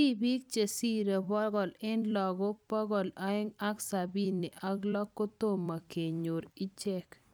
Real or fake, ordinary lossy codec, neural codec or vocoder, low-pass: fake; none; vocoder, 44.1 kHz, 128 mel bands every 256 samples, BigVGAN v2; none